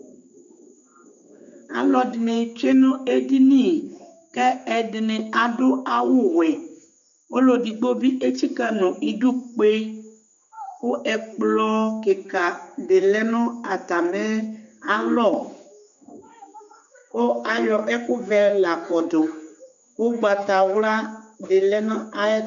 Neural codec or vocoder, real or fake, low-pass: codec, 16 kHz, 4 kbps, X-Codec, HuBERT features, trained on general audio; fake; 7.2 kHz